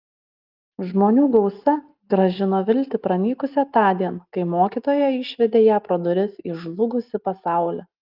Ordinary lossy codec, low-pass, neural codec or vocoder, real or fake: Opus, 24 kbps; 5.4 kHz; none; real